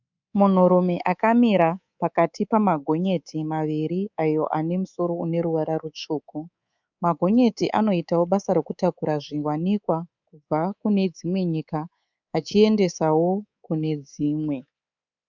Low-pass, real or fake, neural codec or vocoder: 7.2 kHz; fake; codec, 24 kHz, 3.1 kbps, DualCodec